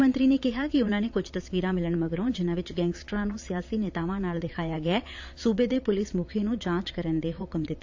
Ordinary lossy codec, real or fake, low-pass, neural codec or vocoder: AAC, 48 kbps; fake; 7.2 kHz; vocoder, 22.05 kHz, 80 mel bands, Vocos